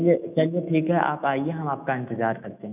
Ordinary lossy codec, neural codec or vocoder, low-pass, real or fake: none; autoencoder, 48 kHz, 128 numbers a frame, DAC-VAE, trained on Japanese speech; 3.6 kHz; fake